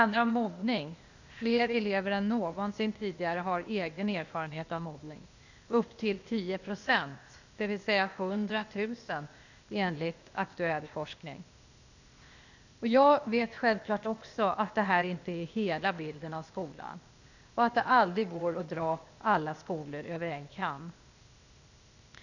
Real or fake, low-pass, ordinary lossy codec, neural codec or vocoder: fake; 7.2 kHz; none; codec, 16 kHz, 0.8 kbps, ZipCodec